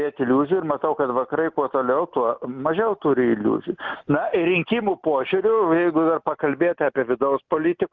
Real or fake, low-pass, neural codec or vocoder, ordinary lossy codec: real; 7.2 kHz; none; Opus, 32 kbps